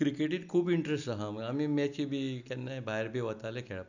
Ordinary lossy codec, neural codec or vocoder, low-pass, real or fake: none; none; 7.2 kHz; real